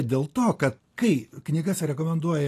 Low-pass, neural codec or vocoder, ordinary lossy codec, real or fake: 14.4 kHz; none; AAC, 48 kbps; real